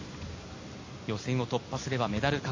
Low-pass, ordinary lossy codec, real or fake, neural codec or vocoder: 7.2 kHz; MP3, 32 kbps; real; none